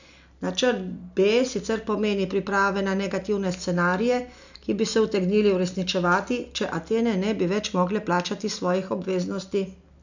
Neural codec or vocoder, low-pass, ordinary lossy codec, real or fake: none; 7.2 kHz; none; real